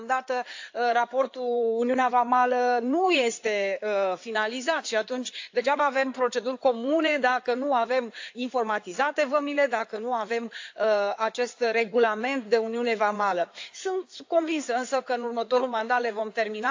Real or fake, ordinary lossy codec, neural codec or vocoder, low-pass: fake; AAC, 48 kbps; codec, 16 kHz in and 24 kHz out, 2.2 kbps, FireRedTTS-2 codec; 7.2 kHz